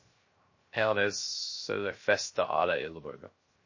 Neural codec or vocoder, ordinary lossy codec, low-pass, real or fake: codec, 16 kHz, 0.3 kbps, FocalCodec; MP3, 32 kbps; 7.2 kHz; fake